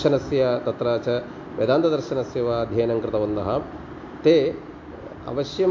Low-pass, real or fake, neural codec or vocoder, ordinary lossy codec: 7.2 kHz; real; none; MP3, 48 kbps